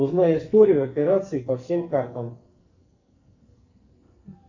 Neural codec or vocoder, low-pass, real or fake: codec, 44.1 kHz, 2.6 kbps, SNAC; 7.2 kHz; fake